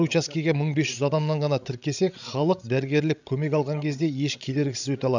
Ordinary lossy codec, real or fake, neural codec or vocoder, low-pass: none; fake; codec, 16 kHz, 16 kbps, FreqCodec, larger model; 7.2 kHz